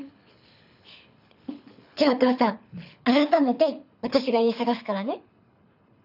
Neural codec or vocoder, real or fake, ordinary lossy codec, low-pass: codec, 24 kHz, 6 kbps, HILCodec; fake; none; 5.4 kHz